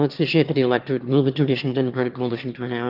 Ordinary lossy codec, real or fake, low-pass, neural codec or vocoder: Opus, 32 kbps; fake; 5.4 kHz; autoencoder, 22.05 kHz, a latent of 192 numbers a frame, VITS, trained on one speaker